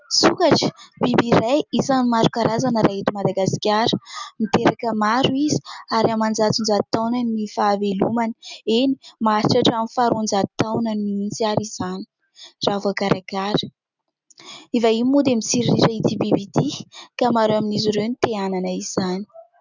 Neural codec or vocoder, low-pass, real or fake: none; 7.2 kHz; real